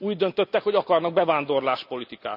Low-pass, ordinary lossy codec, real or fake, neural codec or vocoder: 5.4 kHz; none; real; none